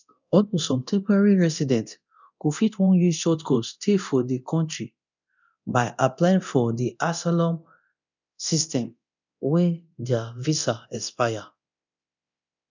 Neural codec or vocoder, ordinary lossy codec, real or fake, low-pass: codec, 24 kHz, 0.9 kbps, DualCodec; none; fake; 7.2 kHz